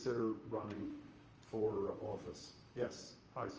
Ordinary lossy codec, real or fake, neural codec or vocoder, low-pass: Opus, 24 kbps; fake; vocoder, 44.1 kHz, 128 mel bands, Pupu-Vocoder; 7.2 kHz